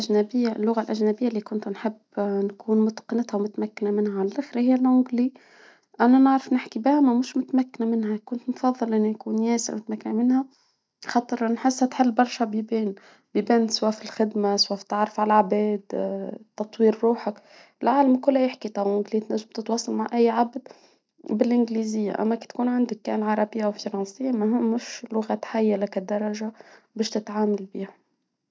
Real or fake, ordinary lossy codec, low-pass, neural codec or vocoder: real; none; none; none